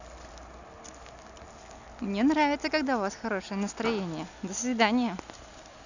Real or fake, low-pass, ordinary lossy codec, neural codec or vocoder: real; 7.2 kHz; none; none